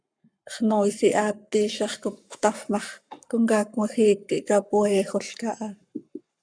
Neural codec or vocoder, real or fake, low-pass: codec, 44.1 kHz, 7.8 kbps, Pupu-Codec; fake; 9.9 kHz